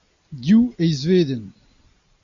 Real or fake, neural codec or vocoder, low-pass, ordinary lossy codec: real; none; 7.2 kHz; MP3, 64 kbps